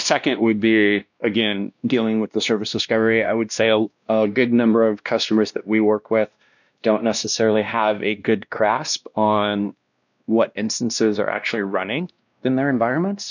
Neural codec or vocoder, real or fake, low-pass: codec, 16 kHz, 1 kbps, X-Codec, WavLM features, trained on Multilingual LibriSpeech; fake; 7.2 kHz